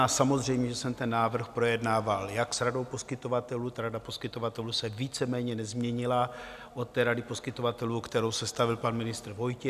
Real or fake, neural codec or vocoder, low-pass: real; none; 14.4 kHz